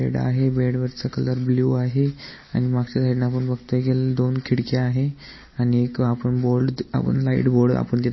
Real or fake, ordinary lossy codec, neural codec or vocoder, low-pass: real; MP3, 24 kbps; none; 7.2 kHz